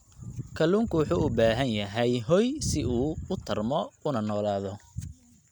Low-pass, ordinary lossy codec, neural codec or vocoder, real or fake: 19.8 kHz; none; none; real